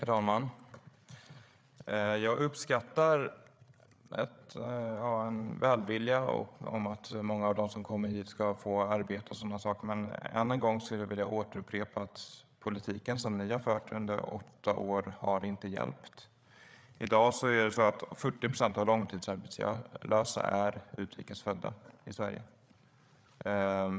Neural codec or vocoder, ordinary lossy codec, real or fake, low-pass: codec, 16 kHz, 8 kbps, FreqCodec, larger model; none; fake; none